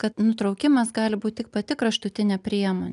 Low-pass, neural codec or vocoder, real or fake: 10.8 kHz; none; real